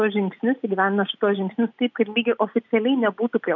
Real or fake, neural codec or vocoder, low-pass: real; none; 7.2 kHz